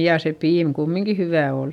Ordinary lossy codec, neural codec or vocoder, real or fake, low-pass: none; none; real; 19.8 kHz